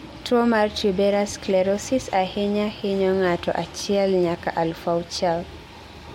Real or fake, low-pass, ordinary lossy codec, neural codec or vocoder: real; 19.8 kHz; MP3, 64 kbps; none